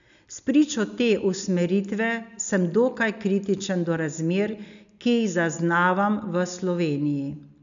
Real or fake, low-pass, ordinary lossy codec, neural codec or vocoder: real; 7.2 kHz; none; none